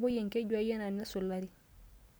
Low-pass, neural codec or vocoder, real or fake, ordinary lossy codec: none; none; real; none